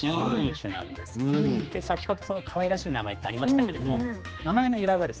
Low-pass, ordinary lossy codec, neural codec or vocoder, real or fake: none; none; codec, 16 kHz, 2 kbps, X-Codec, HuBERT features, trained on general audio; fake